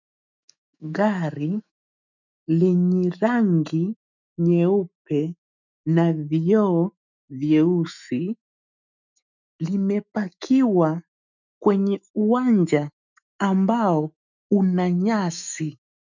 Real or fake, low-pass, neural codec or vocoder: fake; 7.2 kHz; autoencoder, 48 kHz, 128 numbers a frame, DAC-VAE, trained on Japanese speech